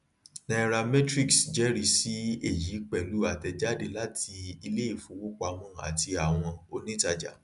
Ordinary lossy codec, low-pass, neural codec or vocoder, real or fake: none; 10.8 kHz; none; real